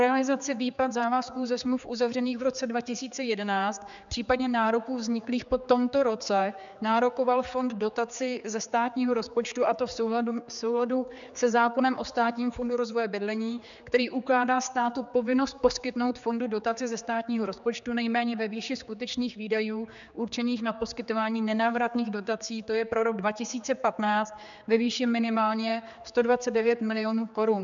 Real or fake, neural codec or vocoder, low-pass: fake; codec, 16 kHz, 4 kbps, X-Codec, HuBERT features, trained on general audio; 7.2 kHz